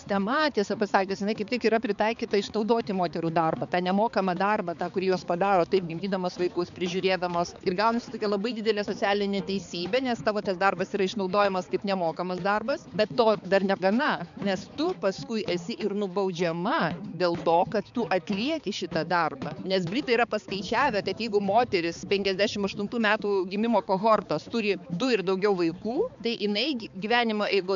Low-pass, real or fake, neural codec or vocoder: 7.2 kHz; fake; codec, 16 kHz, 4 kbps, X-Codec, HuBERT features, trained on balanced general audio